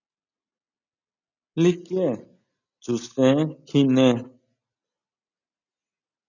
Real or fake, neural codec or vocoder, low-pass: real; none; 7.2 kHz